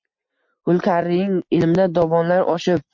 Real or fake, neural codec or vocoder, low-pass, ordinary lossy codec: real; none; 7.2 kHz; MP3, 48 kbps